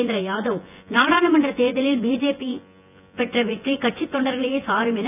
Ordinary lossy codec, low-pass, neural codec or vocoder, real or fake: none; 3.6 kHz; vocoder, 24 kHz, 100 mel bands, Vocos; fake